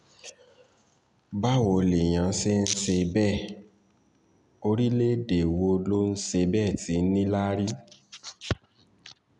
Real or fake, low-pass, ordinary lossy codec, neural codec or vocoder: real; 10.8 kHz; none; none